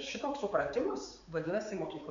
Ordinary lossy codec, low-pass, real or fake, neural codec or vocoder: MP3, 64 kbps; 7.2 kHz; fake; codec, 16 kHz, 4 kbps, X-Codec, HuBERT features, trained on balanced general audio